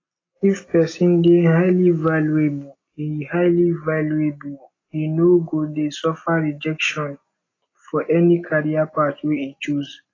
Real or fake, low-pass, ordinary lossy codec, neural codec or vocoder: real; 7.2 kHz; AAC, 32 kbps; none